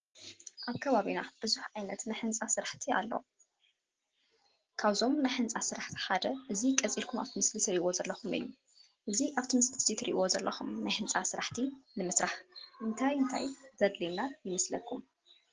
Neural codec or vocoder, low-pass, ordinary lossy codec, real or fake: none; 7.2 kHz; Opus, 16 kbps; real